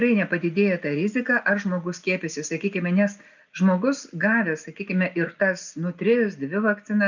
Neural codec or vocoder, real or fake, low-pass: none; real; 7.2 kHz